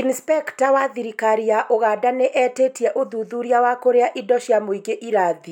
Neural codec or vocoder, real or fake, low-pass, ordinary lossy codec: none; real; 19.8 kHz; none